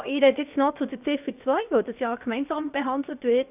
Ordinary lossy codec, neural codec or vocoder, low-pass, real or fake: AAC, 32 kbps; codec, 16 kHz, about 1 kbps, DyCAST, with the encoder's durations; 3.6 kHz; fake